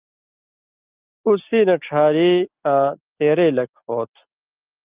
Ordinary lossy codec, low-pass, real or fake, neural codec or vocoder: Opus, 32 kbps; 3.6 kHz; real; none